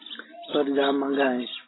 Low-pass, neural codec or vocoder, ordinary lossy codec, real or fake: 7.2 kHz; none; AAC, 16 kbps; real